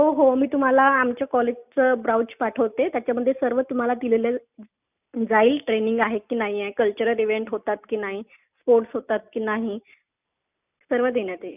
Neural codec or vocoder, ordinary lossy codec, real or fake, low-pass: none; none; real; 3.6 kHz